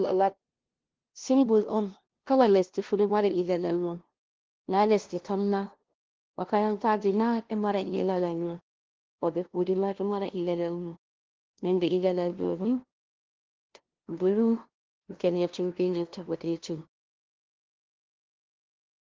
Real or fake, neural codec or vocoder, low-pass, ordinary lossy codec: fake; codec, 16 kHz, 0.5 kbps, FunCodec, trained on LibriTTS, 25 frames a second; 7.2 kHz; Opus, 16 kbps